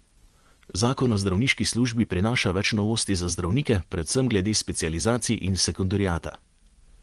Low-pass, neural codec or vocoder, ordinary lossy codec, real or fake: 10.8 kHz; vocoder, 24 kHz, 100 mel bands, Vocos; Opus, 24 kbps; fake